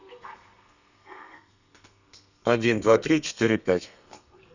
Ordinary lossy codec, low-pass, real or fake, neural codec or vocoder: none; 7.2 kHz; fake; codec, 32 kHz, 1.9 kbps, SNAC